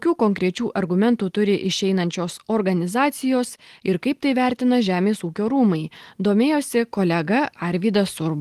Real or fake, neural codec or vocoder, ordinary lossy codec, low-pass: real; none; Opus, 24 kbps; 14.4 kHz